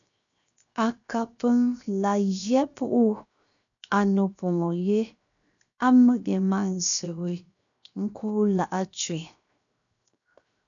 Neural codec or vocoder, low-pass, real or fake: codec, 16 kHz, 0.7 kbps, FocalCodec; 7.2 kHz; fake